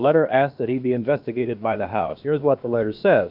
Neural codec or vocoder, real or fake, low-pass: codec, 16 kHz, about 1 kbps, DyCAST, with the encoder's durations; fake; 5.4 kHz